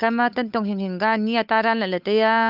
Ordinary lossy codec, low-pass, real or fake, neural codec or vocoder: none; 5.4 kHz; fake; codec, 16 kHz, 8 kbps, FunCodec, trained on Chinese and English, 25 frames a second